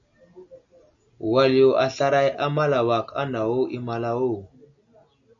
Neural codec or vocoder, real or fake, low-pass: none; real; 7.2 kHz